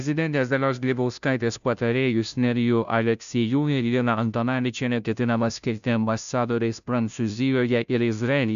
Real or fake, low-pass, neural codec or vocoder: fake; 7.2 kHz; codec, 16 kHz, 0.5 kbps, FunCodec, trained on Chinese and English, 25 frames a second